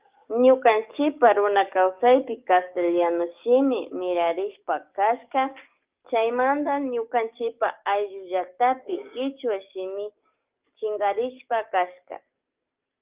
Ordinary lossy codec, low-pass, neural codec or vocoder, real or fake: Opus, 24 kbps; 3.6 kHz; codec, 44.1 kHz, 7.8 kbps, DAC; fake